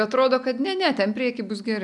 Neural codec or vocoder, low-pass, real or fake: none; 10.8 kHz; real